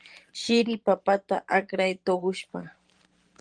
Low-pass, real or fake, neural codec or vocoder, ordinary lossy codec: 9.9 kHz; fake; vocoder, 44.1 kHz, 128 mel bands every 512 samples, BigVGAN v2; Opus, 24 kbps